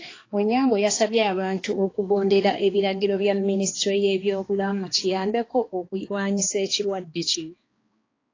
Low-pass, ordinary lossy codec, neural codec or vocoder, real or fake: 7.2 kHz; AAC, 32 kbps; codec, 16 kHz, 2 kbps, X-Codec, HuBERT features, trained on balanced general audio; fake